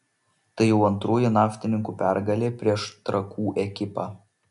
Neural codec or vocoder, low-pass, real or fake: none; 10.8 kHz; real